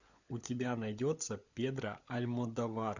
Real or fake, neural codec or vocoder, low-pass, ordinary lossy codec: fake; codec, 16 kHz, 16 kbps, FunCodec, trained on LibriTTS, 50 frames a second; 7.2 kHz; Opus, 64 kbps